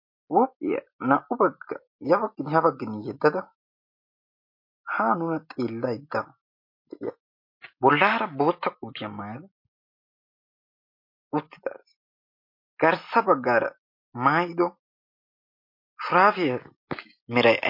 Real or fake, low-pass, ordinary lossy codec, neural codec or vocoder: real; 5.4 kHz; MP3, 32 kbps; none